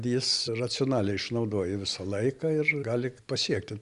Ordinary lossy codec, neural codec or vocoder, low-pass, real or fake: Opus, 64 kbps; none; 10.8 kHz; real